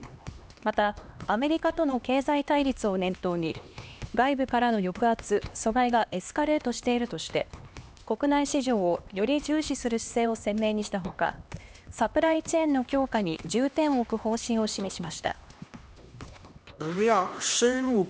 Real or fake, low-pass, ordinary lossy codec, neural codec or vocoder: fake; none; none; codec, 16 kHz, 2 kbps, X-Codec, HuBERT features, trained on LibriSpeech